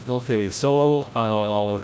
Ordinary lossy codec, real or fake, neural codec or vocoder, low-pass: none; fake; codec, 16 kHz, 0.5 kbps, FreqCodec, larger model; none